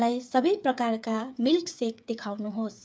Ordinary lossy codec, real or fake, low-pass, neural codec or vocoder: none; fake; none; codec, 16 kHz, 8 kbps, FreqCodec, smaller model